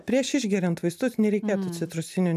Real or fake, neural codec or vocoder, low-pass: real; none; 14.4 kHz